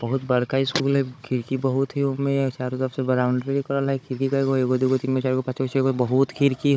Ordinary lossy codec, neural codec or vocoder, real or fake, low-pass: none; codec, 16 kHz, 16 kbps, FunCodec, trained on Chinese and English, 50 frames a second; fake; none